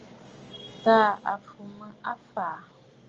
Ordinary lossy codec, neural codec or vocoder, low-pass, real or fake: Opus, 24 kbps; none; 7.2 kHz; real